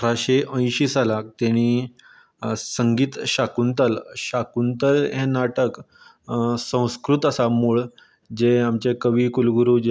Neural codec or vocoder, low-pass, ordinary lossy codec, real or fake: none; none; none; real